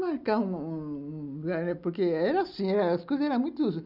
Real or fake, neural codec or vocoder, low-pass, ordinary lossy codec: real; none; 5.4 kHz; none